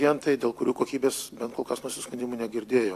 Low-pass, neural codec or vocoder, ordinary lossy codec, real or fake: 14.4 kHz; vocoder, 44.1 kHz, 128 mel bands every 256 samples, BigVGAN v2; AAC, 64 kbps; fake